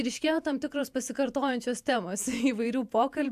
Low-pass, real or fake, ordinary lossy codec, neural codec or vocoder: 14.4 kHz; fake; AAC, 96 kbps; vocoder, 48 kHz, 128 mel bands, Vocos